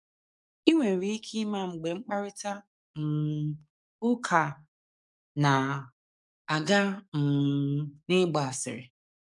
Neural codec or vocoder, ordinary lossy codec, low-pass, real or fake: codec, 44.1 kHz, 7.8 kbps, DAC; none; 10.8 kHz; fake